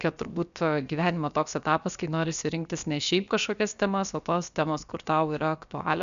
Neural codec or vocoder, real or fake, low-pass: codec, 16 kHz, about 1 kbps, DyCAST, with the encoder's durations; fake; 7.2 kHz